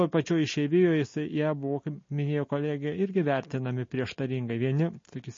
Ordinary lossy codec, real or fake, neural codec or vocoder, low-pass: MP3, 32 kbps; real; none; 7.2 kHz